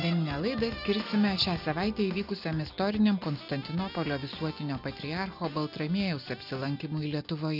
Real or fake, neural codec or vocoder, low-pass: real; none; 5.4 kHz